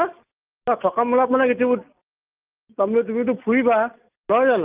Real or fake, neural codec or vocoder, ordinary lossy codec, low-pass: real; none; Opus, 24 kbps; 3.6 kHz